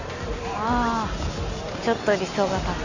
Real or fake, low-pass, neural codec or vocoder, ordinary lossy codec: real; 7.2 kHz; none; none